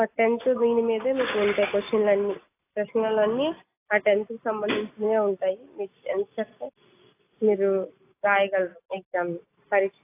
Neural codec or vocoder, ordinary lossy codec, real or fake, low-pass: none; AAC, 24 kbps; real; 3.6 kHz